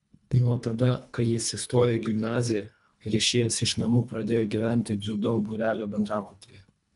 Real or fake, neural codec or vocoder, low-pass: fake; codec, 24 kHz, 1.5 kbps, HILCodec; 10.8 kHz